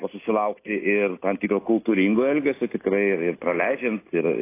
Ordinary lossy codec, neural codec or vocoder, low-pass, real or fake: AAC, 24 kbps; none; 3.6 kHz; real